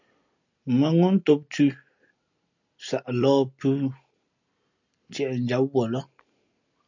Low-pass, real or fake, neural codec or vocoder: 7.2 kHz; real; none